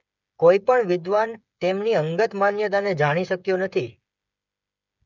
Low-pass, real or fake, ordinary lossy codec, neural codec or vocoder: 7.2 kHz; fake; none; codec, 16 kHz, 8 kbps, FreqCodec, smaller model